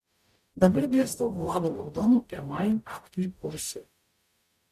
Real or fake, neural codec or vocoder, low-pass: fake; codec, 44.1 kHz, 0.9 kbps, DAC; 14.4 kHz